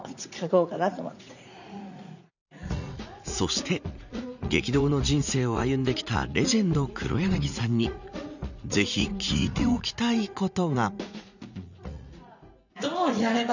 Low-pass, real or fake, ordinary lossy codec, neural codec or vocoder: 7.2 kHz; fake; none; vocoder, 44.1 kHz, 80 mel bands, Vocos